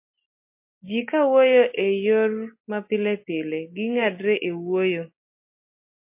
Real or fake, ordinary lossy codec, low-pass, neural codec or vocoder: real; MP3, 24 kbps; 3.6 kHz; none